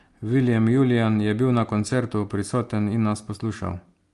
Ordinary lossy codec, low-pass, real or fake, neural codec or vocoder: Opus, 32 kbps; 10.8 kHz; real; none